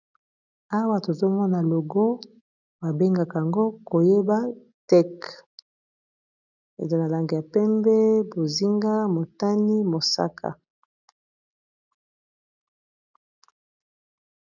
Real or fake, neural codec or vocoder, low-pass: real; none; 7.2 kHz